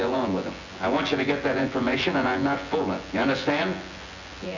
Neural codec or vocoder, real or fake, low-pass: vocoder, 24 kHz, 100 mel bands, Vocos; fake; 7.2 kHz